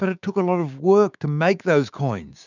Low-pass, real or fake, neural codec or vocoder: 7.2 kHz; fake; codec, 16 kHz, 6 kbps, DAC